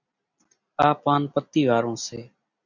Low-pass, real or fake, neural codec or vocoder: 7.2 kHz; real; none